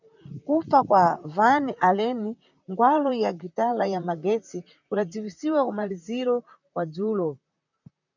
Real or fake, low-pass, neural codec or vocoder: fake; 7.2 kHz; vocoder, 44.1 kHz, 128 mel bands, Pupu-Vocoder